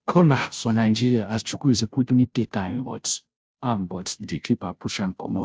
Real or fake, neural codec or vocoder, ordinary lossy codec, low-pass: fake; codec, 16 kHz, 0.5 kbps, FunCodec, trained on Chinese and English, 25 frames a second; none; none